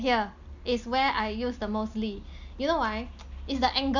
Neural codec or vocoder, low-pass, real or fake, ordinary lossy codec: none; 7.2 kHz; real; none